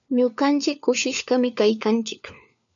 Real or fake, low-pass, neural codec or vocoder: fake; 7.2 kHz; codec, 16 kHz, 4 kbps, FunCodec, trained on LibriTTS, 50 frames a second